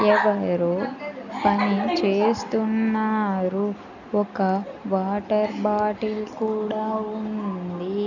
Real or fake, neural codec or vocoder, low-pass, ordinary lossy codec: real; none; 7.2 kHz; none